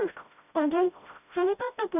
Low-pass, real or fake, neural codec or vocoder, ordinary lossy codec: 3.6 kHz; fake; codec, 16 kHz, 0.5 kbps, FreqCodec, smaller model; none